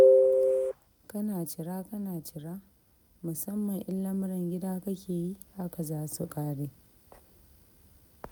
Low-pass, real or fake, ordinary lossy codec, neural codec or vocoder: none; real; none; none